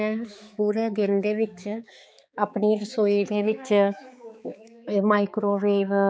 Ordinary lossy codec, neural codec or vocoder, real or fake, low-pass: none; codec, 16 kHz, 4 kbps, X-Codec, HuBERT features, trained on balanced general audio; fake; none